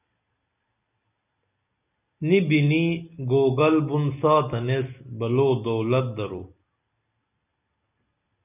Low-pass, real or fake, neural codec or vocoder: 3.6 kHz; real; none